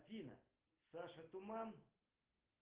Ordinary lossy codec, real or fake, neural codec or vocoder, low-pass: Opus, 16 kbps; real; none; 3.6 kHz